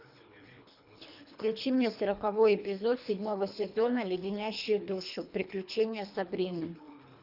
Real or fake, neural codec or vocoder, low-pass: fake; codec, 24 kHz, 3 kbps, HILCodec; 5.4 kHz